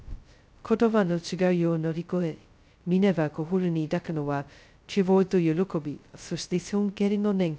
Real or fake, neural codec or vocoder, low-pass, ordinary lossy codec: fake; codec, 16 kHz, 0.2 kbps, FocalCodec; none; none